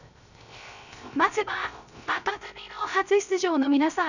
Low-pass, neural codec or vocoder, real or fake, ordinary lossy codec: 7.2 kHz; codec, 16 kHz, 0.3 kbps, FocalCodec; fake; none